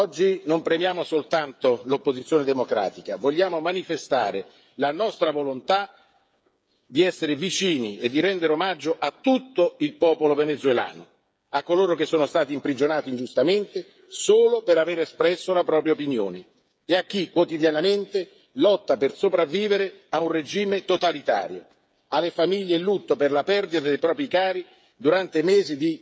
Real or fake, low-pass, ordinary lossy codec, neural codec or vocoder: fake; none; none; codec, 16 kHz, 8 kbps, FreqCodec, smaller model